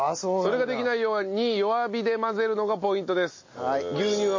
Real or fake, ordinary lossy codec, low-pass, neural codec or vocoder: real; MP3, 32 kbps; 7.2 kHz; none